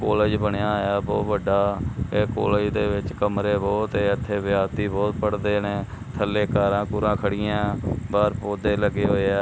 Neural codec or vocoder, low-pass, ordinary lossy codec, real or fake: none; none; none; real